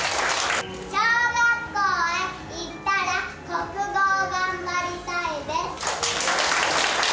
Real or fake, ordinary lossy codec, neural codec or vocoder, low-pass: real; none; none; none